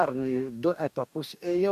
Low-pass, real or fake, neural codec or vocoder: 14.4 kHz; fake; codec, 44.1 kHz, 2.6 kbps, DAC